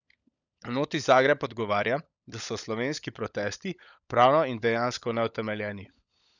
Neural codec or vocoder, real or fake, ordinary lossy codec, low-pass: codec, 16 kHz, 16 kbps, FunCodec, trained on LibriTTS, 50 frames a second; fake; none; 7.2 kHz